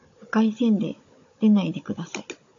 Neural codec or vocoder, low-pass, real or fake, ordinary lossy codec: codec, 16 kHz, 16 kbps, FunCodec, trained on Chinese and English, 50 frames a second; 7.2 kHz; fake; AAC, 48 kbps